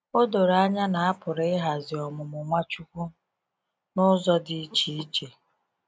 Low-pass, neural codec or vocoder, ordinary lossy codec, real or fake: none; none; none; real